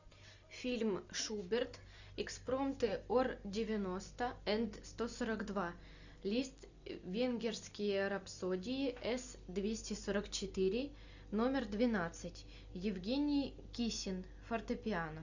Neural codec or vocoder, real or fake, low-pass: none; real; 7.2 kHz